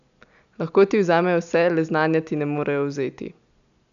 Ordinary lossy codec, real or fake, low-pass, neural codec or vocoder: none; real; 7.2 kHz; none